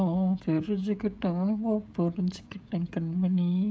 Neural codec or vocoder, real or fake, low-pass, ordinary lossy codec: codec, 16 kHz, 16 kbps, FreqCodec, smaller model; fake; none; none